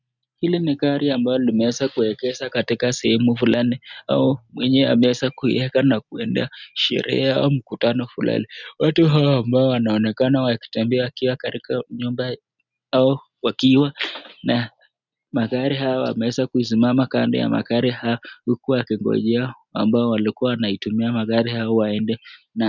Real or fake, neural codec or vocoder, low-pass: real; none; 7.2 kHz